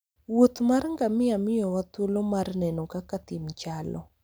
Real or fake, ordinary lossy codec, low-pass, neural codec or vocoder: real; none; none; none